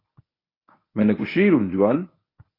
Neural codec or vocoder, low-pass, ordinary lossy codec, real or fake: codec, 24 kHz, 0.9 kbps, WavTokenizer, medium speech release version 1; 5.4 kHz; AAC, 24 kbps; fake